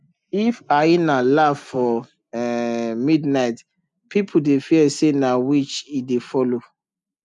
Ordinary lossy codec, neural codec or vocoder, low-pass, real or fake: none; none; 10.8 kHz; real